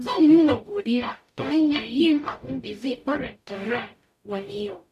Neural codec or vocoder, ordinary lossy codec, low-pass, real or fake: codec, 44.1 kHz, 0.9 kbps, DAC; none; 14.4 kHz; fake